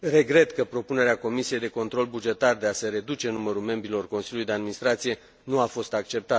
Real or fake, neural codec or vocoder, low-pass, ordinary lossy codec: real; none; none; none